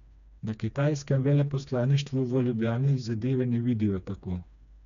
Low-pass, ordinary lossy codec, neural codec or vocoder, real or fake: 7.2 kHz; none; codec, 16 kHz, 2 kbps, FreqCodec, smaller model; fake